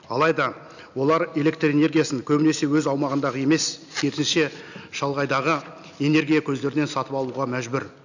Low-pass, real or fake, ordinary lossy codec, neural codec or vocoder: 7.2 kHz; real; none; none